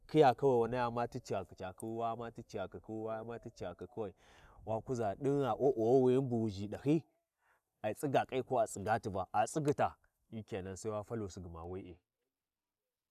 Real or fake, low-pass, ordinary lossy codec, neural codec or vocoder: real; 14.4 kHz; none; none